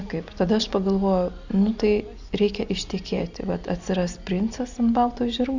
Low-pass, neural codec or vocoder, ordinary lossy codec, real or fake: 7.2 kHz; none; Opus, 64 kbps; real